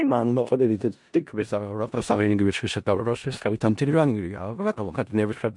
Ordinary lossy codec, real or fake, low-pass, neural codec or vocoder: MP3, 64 kbps; fake; 10.8 kHz; codec, 16 kHz in and 24 kHz out, 0.4 kbps, LongCat-Audio-Codec, four codebook decoder